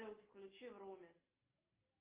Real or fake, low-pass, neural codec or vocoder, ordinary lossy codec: real; 3.6 kHz; none; Opus, 32 kbps